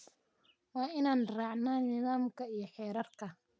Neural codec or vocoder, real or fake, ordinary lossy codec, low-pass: none; real; none; none